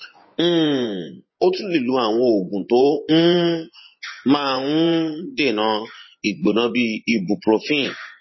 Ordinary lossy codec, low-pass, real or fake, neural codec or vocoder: MP3, 24 kbps; 7.2 kHz; fake; vocoder, 24 kHz, 100 mel bands, Vocos